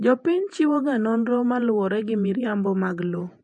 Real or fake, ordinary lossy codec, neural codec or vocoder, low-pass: fake; MP3, 48 kbps; vocoder, 48 kHz, 128 mel bands, Vocos; 10.8 kHz